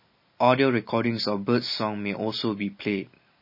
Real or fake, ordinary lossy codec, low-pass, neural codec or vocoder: real; MP3, 24 kbps; 5.4 kHz; none